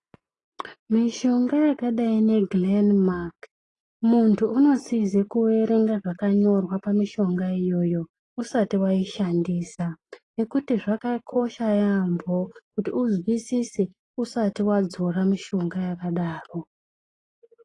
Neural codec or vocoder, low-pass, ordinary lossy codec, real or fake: none; 10.8 kHz; AAC, 32 kbps; real